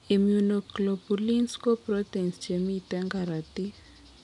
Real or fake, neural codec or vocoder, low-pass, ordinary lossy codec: real; none; 10.8 kHz; none